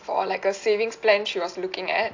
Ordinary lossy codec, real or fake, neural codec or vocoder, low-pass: AAC, 48 kbps; real; none; 7.2 kHz